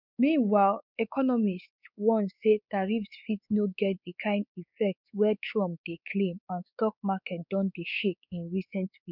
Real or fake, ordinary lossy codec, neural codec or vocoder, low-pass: fake; none; codec, 16 kHz, 4 kbps, X-Codec, WavLM features, trained on Multilingual LibriSpeech; 5.4 kHz